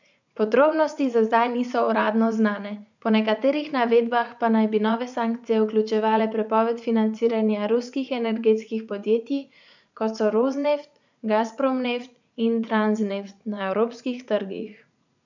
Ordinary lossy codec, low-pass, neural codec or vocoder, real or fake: none; 7.2 kHz; vocoder, 44.1 kHz, 80 mel bands, Vocos; fake